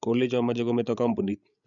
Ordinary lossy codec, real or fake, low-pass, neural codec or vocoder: none; fake; 7.2 kHz; codec, 16 kHz, 16 kbps, FreqCodec, larger model